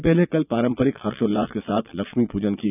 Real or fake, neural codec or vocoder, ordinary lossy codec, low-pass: fake; vocoder, 22.05 kHz, 80 mel bands, Vocos; none; 3.6 kHz